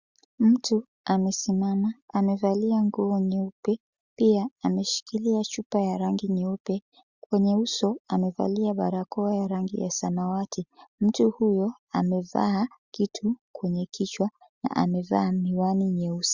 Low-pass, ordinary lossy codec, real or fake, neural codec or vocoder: 7.2 kHz; Opus, 64 kbps; real; none